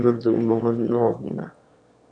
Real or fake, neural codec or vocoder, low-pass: fake; autoencoder, 22.05 kHz, a latent of 192 numbers a frame, VITS, trained on one speaker; 9.9 kHz